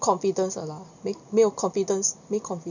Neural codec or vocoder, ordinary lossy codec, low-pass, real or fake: none; none; 7.2 kHz; real